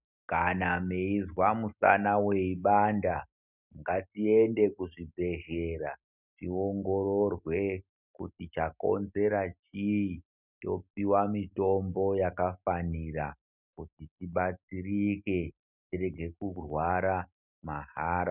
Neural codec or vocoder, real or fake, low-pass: none; real; 3.6 kHz